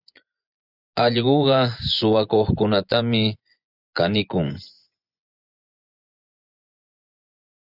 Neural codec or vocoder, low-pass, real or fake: vocoder, 24 kHz, 100 mel bands, Vocos; 5.4 kHz; fake